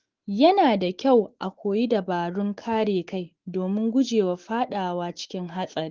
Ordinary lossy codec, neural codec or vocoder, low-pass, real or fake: Opus, 16 kbps; autoencoder, 48 kHz, 128 numbers a frame, DAC-VAE, trained on Japanese speech; 7.2 kHz; fake